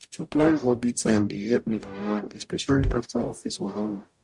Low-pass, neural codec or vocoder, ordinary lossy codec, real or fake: 10.8 kHz; codec, 44.1 kHz, 0.9 kbps, DAC; MP3, 64 kbps; fake